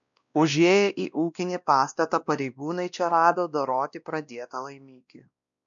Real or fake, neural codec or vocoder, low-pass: fake; codec, 16 kHz, 1 kbps, X-Codec, WavLM features, trained on Multilingual LibriSpeech; 7.2 kHz